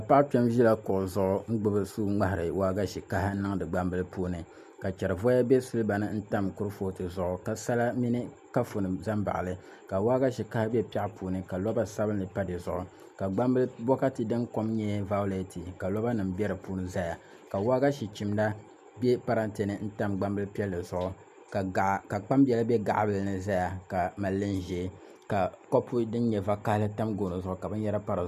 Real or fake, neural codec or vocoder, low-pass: real; none; 14.4 kHz